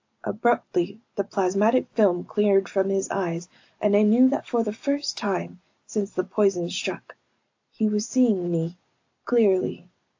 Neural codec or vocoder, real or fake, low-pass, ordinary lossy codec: none; real; 7.2 kHz; AAC, 48 kbps